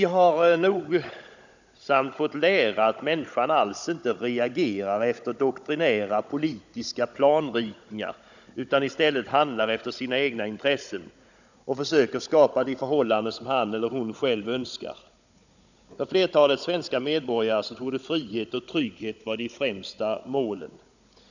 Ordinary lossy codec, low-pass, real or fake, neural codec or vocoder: none; 7.2 kHz; fake; codec, 16 kHz, 16 kbps, FunCodec, trained on Chinese and English, 50 frames a second